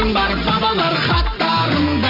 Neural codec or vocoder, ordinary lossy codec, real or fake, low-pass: none; none; real; 5.4 kHz